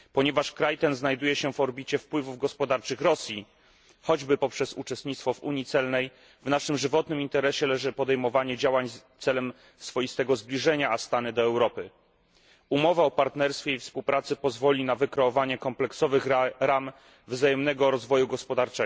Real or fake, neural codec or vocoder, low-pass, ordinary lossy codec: real; none; none; none